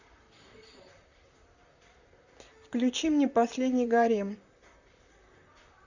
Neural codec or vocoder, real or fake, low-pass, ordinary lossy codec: vocoder, 44.1 kHz, 128 mel bands, Pupu-Vocoder; fake; 7.2 kHz; Opus, 64 kbps